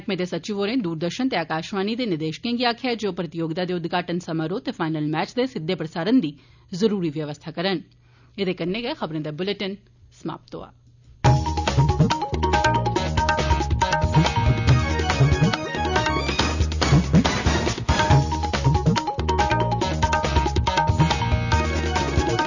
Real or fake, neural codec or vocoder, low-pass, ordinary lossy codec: real; none; 7.2 kHz; none